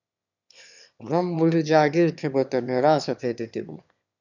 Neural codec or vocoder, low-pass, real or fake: autoencoder, 22.05 kHz, a latent of 192 numbers a frame, VITS, trained on one speaker; 7.2 kHz; fake